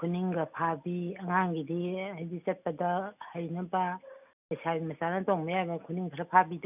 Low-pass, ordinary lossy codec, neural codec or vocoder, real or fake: 3.6 kHz; none; none; real